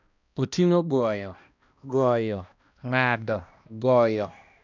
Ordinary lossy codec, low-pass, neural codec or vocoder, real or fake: none; 7.2 kHz; codec, 16 kHz, 1 kbps, X-Codec, HuBERT features, trained on balanced general audio; fake